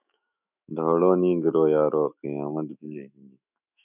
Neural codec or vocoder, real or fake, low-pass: autoencoder, 48 kHz, 128 numbers a frame, DAC-VAE, trained on Japanese speech; fake; 3.6 kHz